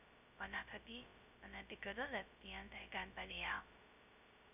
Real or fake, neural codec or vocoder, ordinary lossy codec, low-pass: fake; codec, 16 kHz, 0.2 kbps, FocalCodec; none; 3.6 kHz